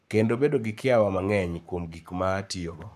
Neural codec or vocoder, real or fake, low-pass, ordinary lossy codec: codec, 44.1 kHz, 7.8 kbps, Pupu-Codec; fake; 14.4 kHz; none